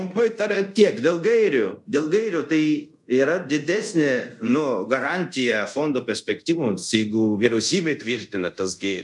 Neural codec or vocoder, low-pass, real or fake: codec, 24 kHz, 0.5 kbps, DualCodec; 10.8 kHz; fake